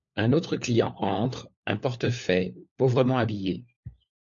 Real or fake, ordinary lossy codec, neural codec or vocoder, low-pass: fake; MP3, 48 kbps; codec, 16 kHz, 4 kbps, FunCodec, trained on LibriTTS, 50 frames a second; 7.2 kHz